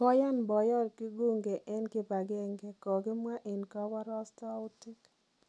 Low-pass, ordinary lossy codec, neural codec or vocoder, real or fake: none; none; none; real